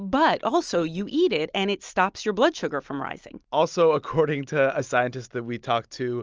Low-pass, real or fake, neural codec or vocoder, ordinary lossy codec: 7.2 kHz; real; none; Opus, 24 kbps